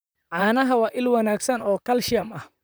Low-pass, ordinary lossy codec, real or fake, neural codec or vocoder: none; none; fake; vocoder, 44.1 kHz, 128 mel bands, Pupu-Vocoder